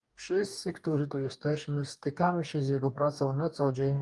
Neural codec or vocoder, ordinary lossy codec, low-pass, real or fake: codec, 44.1 kHz, 2.6 kbps, DAC; Opus, 32 kbps; 10.8 kHz; fake